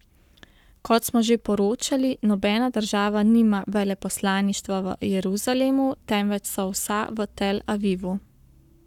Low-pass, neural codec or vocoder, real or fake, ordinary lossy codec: 19.8 kHz; codec, 44.1 kHz, 7.8 kbps, Pupu-Codec; fake; none